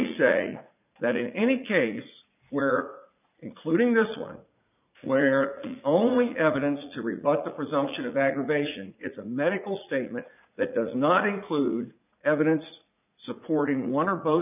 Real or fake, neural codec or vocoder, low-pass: fake; vocoder, 44.1 kHz, 80 mel bands, Vocos; 3.6 kHz